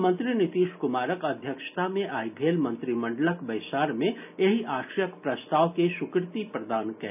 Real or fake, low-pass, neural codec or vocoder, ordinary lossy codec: real; 3.6 kHz; none; none